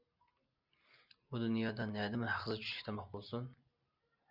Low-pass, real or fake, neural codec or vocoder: 5.4 kHz; real; none